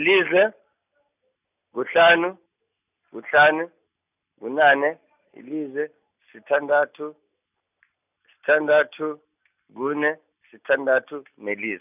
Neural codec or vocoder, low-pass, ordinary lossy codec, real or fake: none; 3.6 kHz; none; real